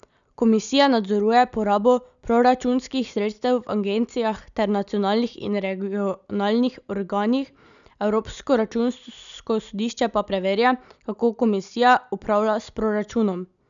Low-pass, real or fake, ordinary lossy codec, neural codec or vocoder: 7.2 kHz; real; none; none